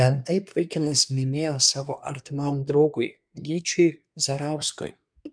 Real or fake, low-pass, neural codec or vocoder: fake; 9.9 kHz; codec, 24 kHz, 1 kbps, SNAC